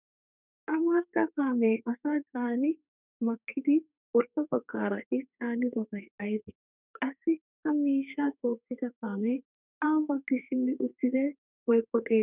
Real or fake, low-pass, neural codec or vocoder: fake; 3.6 kHz; codec, 32 kHz, 1.9 kbps, SNAC